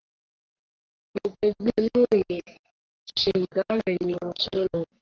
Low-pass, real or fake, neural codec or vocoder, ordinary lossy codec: 7.2 kHz; fake; codec, 44.1 kHz, 3.4 kbps, Pupu-Codec; Opus, 16 kbps